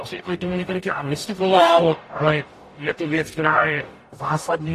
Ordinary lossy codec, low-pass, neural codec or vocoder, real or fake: AAC, 48 kbps; 14.4 kHz; codec, 44.1 kHz, 0.9 kbps, DAC; fake